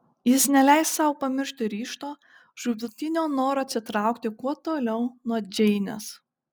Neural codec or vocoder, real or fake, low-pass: none; real; 19.8 kHz